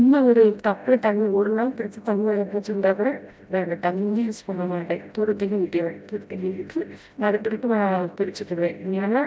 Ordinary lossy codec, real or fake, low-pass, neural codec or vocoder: none; fake; none; codec, 16 kHz, 0.5 kbps, FreqCodec, smaller model